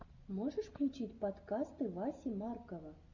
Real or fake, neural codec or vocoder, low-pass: real; none; 7.2 kHz